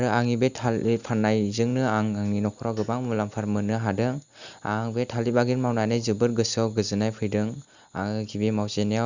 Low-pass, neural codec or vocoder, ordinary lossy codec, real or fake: 7.2 kHz; none; Opus, 64 kbps; real